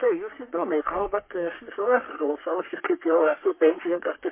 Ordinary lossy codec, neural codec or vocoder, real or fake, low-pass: MP3, 24 kbps; codec, 44.1 kHz, 3.4 kbps, Pupu-Codec; fake; 3.6 kHz